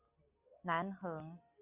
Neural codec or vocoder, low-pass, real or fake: none; 3.6 kHz; real